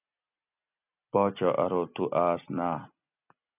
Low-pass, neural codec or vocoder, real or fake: 3.6 kHz; none; real